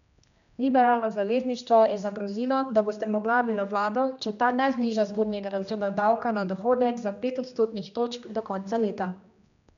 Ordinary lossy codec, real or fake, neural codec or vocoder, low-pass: none; fake; codec, 16 kHz, 1 kbps, X-Codec, HuBERT features, trained on general audio; 7.2 kHz